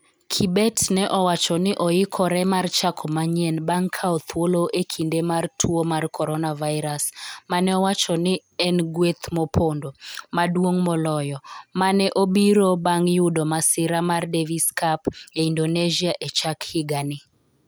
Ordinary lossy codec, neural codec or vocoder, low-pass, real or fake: none; none; none; real